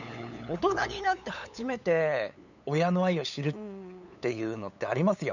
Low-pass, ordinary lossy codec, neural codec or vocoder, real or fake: 7.2 kHz; none; codec, 16 kHz, 8 kbps, FunCodec, trained on LibriTTS, 25 frames a second; fake